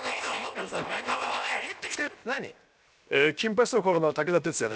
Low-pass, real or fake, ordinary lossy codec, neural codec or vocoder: none; fake; none; codec, 16 kHz, 0.7 kbps, FocalCodec